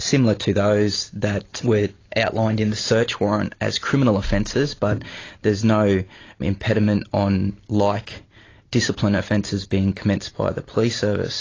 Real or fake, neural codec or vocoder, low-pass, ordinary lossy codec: real; none; 7.2 kHz; AAC, 32 kbps